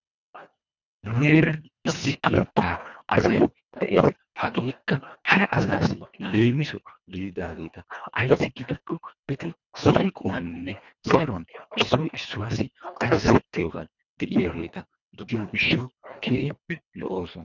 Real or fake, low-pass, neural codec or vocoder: fake; 7.2 kHz; codec, 24 kHz, 1.5 kbps, HILCodec